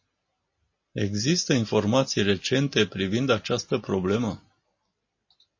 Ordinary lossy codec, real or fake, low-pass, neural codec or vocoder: MP3, 32 kbps; real; 7.2 kHz; none